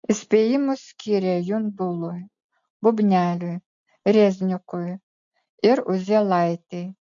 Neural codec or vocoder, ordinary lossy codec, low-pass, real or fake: none; MP3, 64 kbps; 7.2 kHz; real